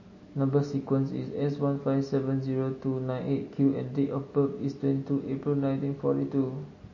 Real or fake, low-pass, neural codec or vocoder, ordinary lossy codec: real; 7.2 kHz; none; MP3, 32 kbps